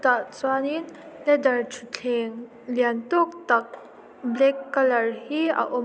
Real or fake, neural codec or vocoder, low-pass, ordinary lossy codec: real; none; none; none